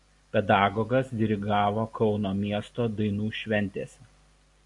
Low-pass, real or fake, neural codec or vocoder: 10.8 kHz; real; none